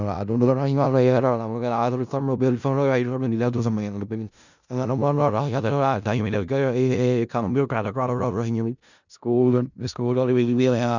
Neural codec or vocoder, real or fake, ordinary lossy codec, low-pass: codec, 16 kHz in and 24 kHz out, 0.4 kbps, LongCat-Audio-Codec, four codebook decoder; fake; none; 7.2 kHz